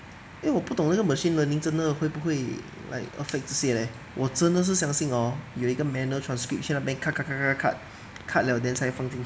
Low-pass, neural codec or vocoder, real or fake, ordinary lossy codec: none; none; real; none